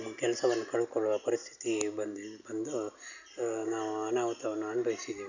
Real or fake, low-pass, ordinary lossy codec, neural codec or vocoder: real; 7.2 kHz; none; none